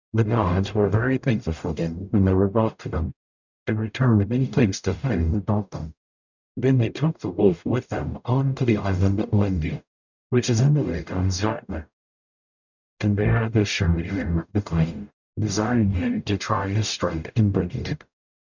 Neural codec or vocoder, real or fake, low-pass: codec, 44.1 kHz, 0.9 kbps, DAC; fake; 7.2 kHz